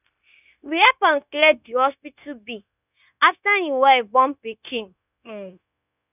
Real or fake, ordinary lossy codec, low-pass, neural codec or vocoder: fake; none; 3.6 kHz; codec, 16 kHz, 0.9 kbps, LongCat-Audio-Codec